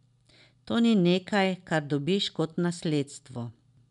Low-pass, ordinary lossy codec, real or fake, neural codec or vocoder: 10.8 kHz; none; real; none